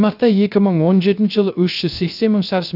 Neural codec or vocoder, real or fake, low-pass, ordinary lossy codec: codec, 16 kHz, 0.3 kbps, FocalCodec; fake; 5.4 kHz; none